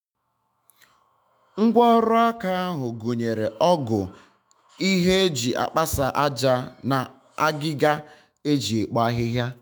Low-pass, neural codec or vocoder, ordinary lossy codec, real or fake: none; autoencoder, 48 kHz, 128 numbers a frame, DAC-VAE, trained on Japanese speech; none; fake